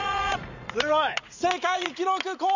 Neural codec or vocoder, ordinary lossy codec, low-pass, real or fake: vocoder, 44.1 kHz, 80 mel bands, Vocos; none; 7.2 kHz; fake